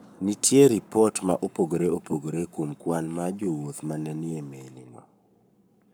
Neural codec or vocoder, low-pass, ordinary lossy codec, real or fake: codec, 44.1 kHz, 7.8 kbps, Pupu-Codec; none; none; fake